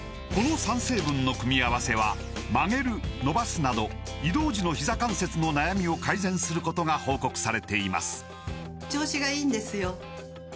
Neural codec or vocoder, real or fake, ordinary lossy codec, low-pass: none; real; none; none